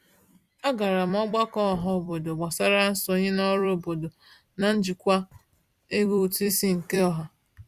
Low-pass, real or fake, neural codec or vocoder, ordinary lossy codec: 14.4 kHz; fake; vocoder, 44.1 kHz, 128 mel bands every 256 samples, BigVGAN v2; Opus, 64 kbps